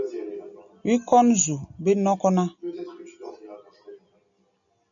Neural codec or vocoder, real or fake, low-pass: none; real; 7.2 kHz